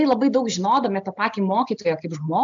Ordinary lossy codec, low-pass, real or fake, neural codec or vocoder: AAC, 64 kbps; 7.2 kHz; real; none